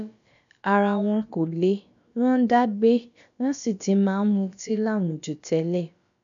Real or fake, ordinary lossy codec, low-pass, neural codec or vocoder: fake; none; 7.2 kHz; codec, 16 kHz, about 1 kbps, DyCAST, with the encoder's durations